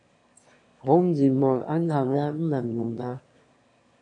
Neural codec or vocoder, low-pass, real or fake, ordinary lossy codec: autoencoder, 22.05 kHz, a latent of 192 numbers a frame, VITS, trained on one speaker; 9.9 kHz; fake; AAC, 48 kbps